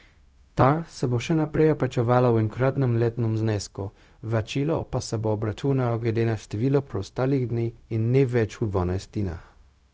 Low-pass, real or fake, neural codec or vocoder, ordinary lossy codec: none; fake; codec, 16 kHz, 0.4 kbps, LongCat-Audio-Codec; none